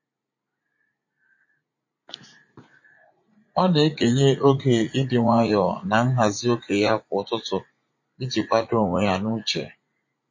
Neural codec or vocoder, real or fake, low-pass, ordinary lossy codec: vocoder, 44.1 kHz, 80 mel bands, Vocos; fake; 7.2 kHz; MP3, 32 kbps